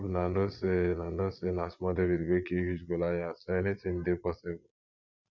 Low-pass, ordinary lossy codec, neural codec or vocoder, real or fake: 7.2 kHz; none; vocoder, 22.05 kHz, 80 mel bands, Vocos; fake